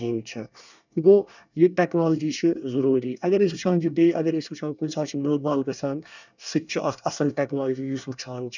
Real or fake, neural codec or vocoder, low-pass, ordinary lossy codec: fake; codec, 24 kHz, 1 kbps, SNAC; 7.2 kHz; none